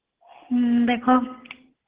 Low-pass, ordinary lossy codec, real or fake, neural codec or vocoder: 3.6 kHz; Opus, 16 kbps; real; none